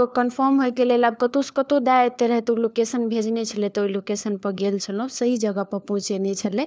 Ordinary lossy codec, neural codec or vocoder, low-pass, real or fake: none; codec, 16 kHz, 4 kbps, FunCodec, trained on LibriTTS, 50 frames a second; none; fake